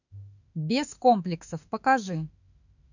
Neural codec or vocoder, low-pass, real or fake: autoencoder, 48 kHz, 32 numbers a frame, DAC-VAE, trained on Japanese speech; 7.2 kHz; fake